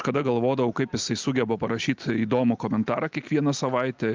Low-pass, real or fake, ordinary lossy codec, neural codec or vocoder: 7.2 kHz; real; Opus, 32 kbps; none